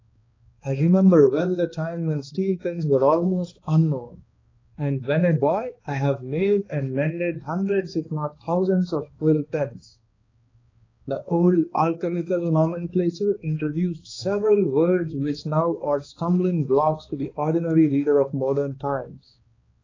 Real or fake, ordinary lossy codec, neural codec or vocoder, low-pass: fake; AAC, 32 kbps; codec, 16 kHz, 2 kbps, X-Codec, HuBERT features, trained on balanced general audio; 7.2 kHz